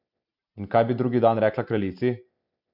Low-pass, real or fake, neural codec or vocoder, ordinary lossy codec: 5.4 kHz; real; none; none